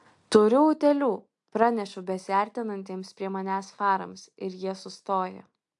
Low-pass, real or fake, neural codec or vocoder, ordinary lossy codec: 10.8 kHz; real; none; AAC, 64 kbps